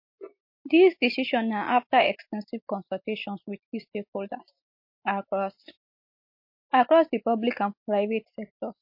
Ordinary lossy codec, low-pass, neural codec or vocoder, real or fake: MP3, 32 kbps; 5.4 kHz; none; real